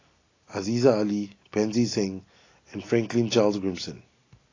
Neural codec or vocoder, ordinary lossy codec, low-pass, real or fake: none; AAC, 32 kbps; 7.2 kHz; real